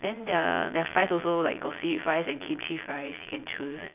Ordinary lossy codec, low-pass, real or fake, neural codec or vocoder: none; 3.6 kHz; fake; vocoder, 22.05 kHz, 80 mel bands, Vocos